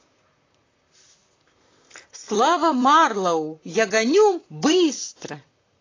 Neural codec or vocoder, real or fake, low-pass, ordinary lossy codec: vocoder, 44.1 kHz, 128 mel bands, Pupu-Vocoder; fake; 7.2 kHz; AAC, 32 kbps